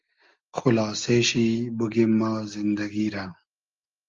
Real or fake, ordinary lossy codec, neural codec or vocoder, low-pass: real; Opus, 32 kbps; none; 7.2 kHz